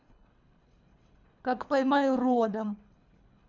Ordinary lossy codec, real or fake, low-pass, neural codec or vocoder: none; fake; 7.2 kHz; codec, 24 kHz, 3 kbps, HILCodec